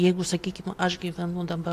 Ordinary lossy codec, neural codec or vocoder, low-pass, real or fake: AAC, 64 kbps; none; 14.4 kHz; real